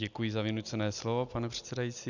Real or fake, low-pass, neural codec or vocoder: real; 7.2 kHz; none